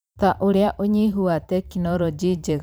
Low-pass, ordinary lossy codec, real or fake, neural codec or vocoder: none; none; fake; vocoder, 44.1 kHz, 128 mel bands every 512 samples, BigVGAN v2